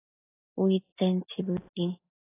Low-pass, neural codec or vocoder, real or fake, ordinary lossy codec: 3.6 kHz; none; real; AAC, 16 kbps